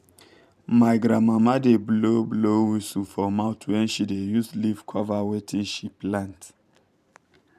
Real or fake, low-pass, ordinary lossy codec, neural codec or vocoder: fake; 14.4 kHz; none; vocoder, 44.1 kHz, 128 mel bands every 512 samples, BigVGAN v2